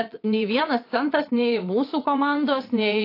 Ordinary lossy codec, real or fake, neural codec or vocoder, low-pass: AAC, 32 kbps; fake; vocoder, 22.05 kHz, 80 mel bands, WaveNeXt; 5.4 kHz